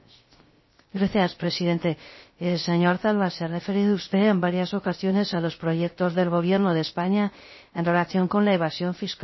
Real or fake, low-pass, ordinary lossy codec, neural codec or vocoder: fake; 7.2 kHz; MP3, 24 kbps; codec, 16 kHz, 0.3 kbps, FocalCodec